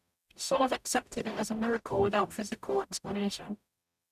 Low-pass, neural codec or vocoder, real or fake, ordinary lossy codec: 14.4 kHz; codec, 44.1 kHz, 0.9 kbps, DAC; fake; none